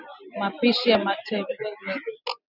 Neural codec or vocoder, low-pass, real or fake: none; 5.4 kHz; real